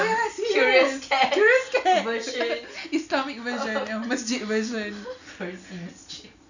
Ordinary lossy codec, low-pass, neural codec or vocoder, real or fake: none; 7.2 kHz; none; real